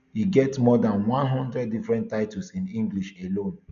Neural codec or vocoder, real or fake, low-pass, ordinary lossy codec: none; real; 7.2 kHz; none